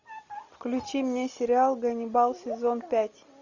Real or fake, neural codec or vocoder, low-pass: real; none; 7.2 kHz